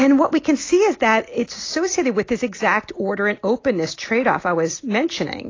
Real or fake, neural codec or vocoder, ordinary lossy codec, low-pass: real; none; AAC, 32 kbps; 7.2 kHz